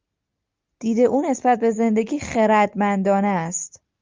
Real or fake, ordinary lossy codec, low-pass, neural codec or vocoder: real; Opus, 24 kbps; 7.2 kHz; none